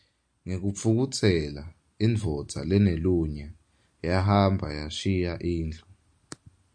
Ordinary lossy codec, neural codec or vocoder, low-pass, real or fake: MP3, 96 kbps; none; 9.9 kHz; real